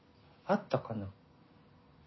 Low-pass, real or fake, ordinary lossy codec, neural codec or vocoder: 7.2 kHz; real; MP3, 24 kbps; none